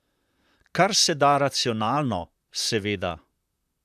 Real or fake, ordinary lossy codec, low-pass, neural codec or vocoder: fake; none; 14.4 kHz; vocoder, 44.1 kHz, 128 mel bands, Pupu-Vocoder